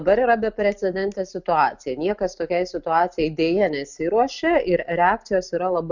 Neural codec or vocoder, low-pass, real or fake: none; 7.2 kHz; real